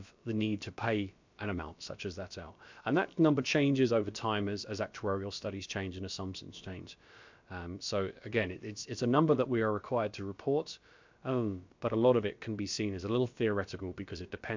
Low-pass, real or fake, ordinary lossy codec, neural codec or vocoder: 7.2 kHz; fake; MP3, 64 kbps; codec, 16 kHz, about 1 kbps, DyCAST, with the encoder's durations